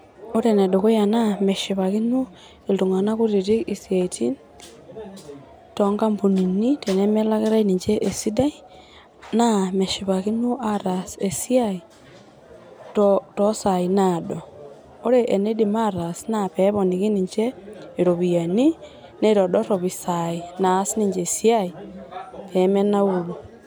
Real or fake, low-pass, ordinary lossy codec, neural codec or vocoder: real; none; none; none